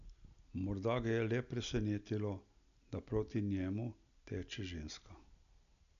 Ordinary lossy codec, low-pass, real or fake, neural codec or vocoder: none; 7.2 kHz; real; none